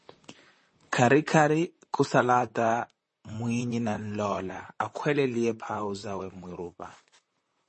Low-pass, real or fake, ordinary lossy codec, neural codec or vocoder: 10.8 kHz; fake; MP3, 32 kbps; vocoder, 44.1 kHz, 128 mel bands, Pupu-Vocoder